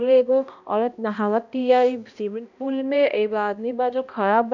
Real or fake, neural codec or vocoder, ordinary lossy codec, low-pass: fake; codec, 16 kHz, 1 kbps, X-Codec, HuBERT features, trained on balanced general audio; none; 7.2 kHz